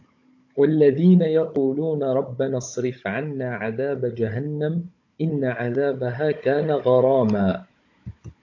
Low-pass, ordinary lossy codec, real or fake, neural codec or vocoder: 7.2 kHz; AAC, 48 kbps; fake; codec, 16 kHz, 16 kbps, FunCodec, trained on Chinese and English, 50 frames a second